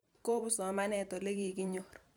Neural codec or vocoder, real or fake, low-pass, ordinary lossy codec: vocoder, 44.1 kHz, 128 mel bands every 512 samples, BigVGAN v2; fake; none; none